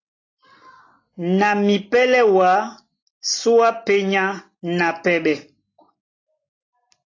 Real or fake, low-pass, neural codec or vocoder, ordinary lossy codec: real; 7.2 kHz; none; AAC, 32 kbps